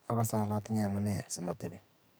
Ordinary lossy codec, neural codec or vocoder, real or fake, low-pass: none; codec, 44.1 kHz, 2.6 kbps, SNAC; fake; none